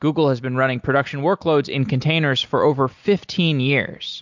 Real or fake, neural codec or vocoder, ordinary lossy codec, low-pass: real; none; MP3, 64 kbps; 7.2 kHz